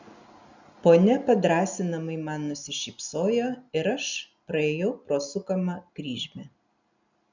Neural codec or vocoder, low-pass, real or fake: none; 7.2 kHz; real